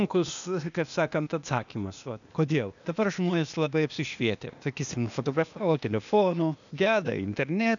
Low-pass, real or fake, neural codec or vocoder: 7.2 kHz; fake; codec, 16 kHz, 0.8 kbps, ZipCodec